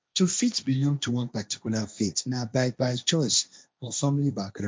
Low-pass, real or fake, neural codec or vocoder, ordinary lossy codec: none; fake; codec, 16 kHz, 1.1 kbps, Voila-Tokenizer; none